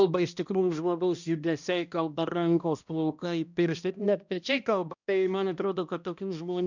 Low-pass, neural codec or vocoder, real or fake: 7.2 kHz; codec, 16 kHz, 1 kbps, X-Codec, HuBERT features, trained on balanced general audio; fake